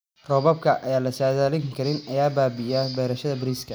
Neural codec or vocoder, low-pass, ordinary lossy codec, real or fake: none; none; none; real